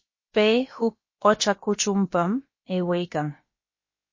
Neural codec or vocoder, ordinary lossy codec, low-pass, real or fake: codec, 16 kHz, about 1 kbps, DyCAST, with the encoder's durations; MP3, 32 kbps; 7.2 kHz; fake